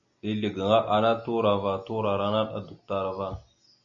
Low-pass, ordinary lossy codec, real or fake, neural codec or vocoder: 7.2 kHz; MP3, 96 kbps; real; none